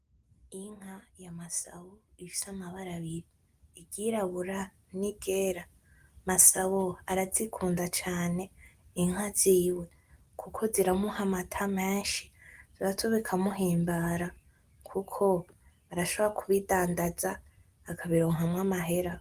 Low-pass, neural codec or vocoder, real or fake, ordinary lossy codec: 14.4 kHz; none; real; Opus, 24 kbps